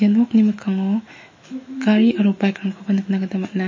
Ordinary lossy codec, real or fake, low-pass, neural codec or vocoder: MP3, 32 kbps; fake; 7.2 kHz; autoencoder, 48 kHz, 128 numbers a frame, DAC-VAE, trained on Japanese speech